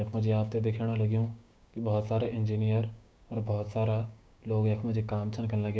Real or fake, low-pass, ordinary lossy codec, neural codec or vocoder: fake; none; none; codec, 16 kHz, 6 kbps, DAC